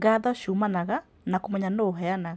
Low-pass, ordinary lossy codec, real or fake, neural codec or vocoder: none; none; real; none